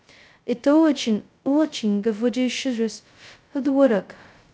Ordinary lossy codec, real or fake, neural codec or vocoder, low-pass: none; fake; codec, 16 kHz, 0.2 kbps, FocalCodec; none